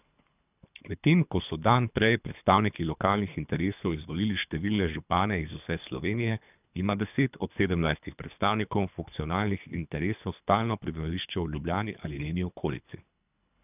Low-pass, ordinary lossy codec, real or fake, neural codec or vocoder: 3.6 kHz; none; fake; codec, 24 kHz, 3 kbps, HILCodec